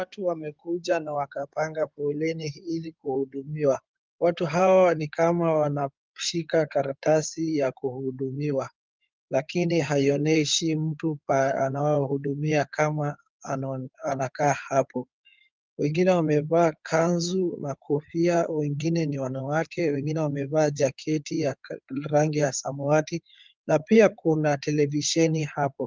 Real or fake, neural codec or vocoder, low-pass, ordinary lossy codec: fake; codec, 16 kHz in and 24 kHz out, 2.2 kbps, FireRedTTS-2 codec; 7.2 kHz; Opus, 24 kbps